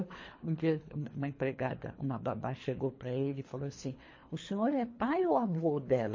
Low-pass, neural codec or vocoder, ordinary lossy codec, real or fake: 7.2 kHz; codec, 24 kHz, 3 kbps, HILCodec; MP3, 32 kbps; fake